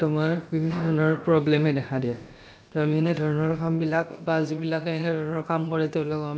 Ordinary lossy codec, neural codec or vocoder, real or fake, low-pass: none; codec, 16 kHz, about 1 kbps, DyCAST, with the encoder's durations; fake; none